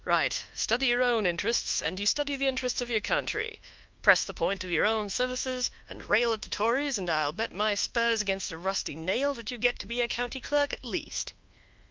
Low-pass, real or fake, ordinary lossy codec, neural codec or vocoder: 7.2 kHz; fake; Opus, 32 kbps; codec, 24 kHz, 1.2 kbps, DualCodec